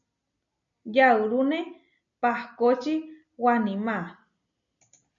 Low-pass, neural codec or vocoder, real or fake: 7.2 kHz; none; real